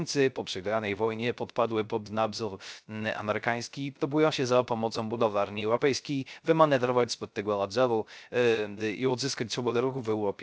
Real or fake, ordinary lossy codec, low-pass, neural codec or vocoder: fake; none; none; codec, 16 kHz, 0.3 kbps, FocalCodec